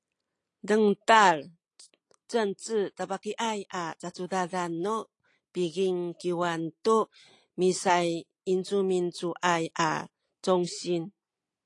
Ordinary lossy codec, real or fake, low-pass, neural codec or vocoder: AAC, 48 kbps; real; 10.8 kHz; none